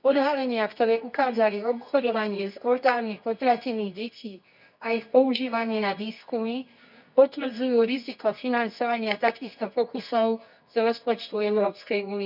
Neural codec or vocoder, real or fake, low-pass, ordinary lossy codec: codec, 24 kHz, 0.9 kbps, WavTokenizer, medium music audio release; fake; 5.4 kHz; none